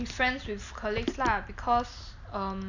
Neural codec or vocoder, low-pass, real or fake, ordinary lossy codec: none; 7.2 kHz; real; none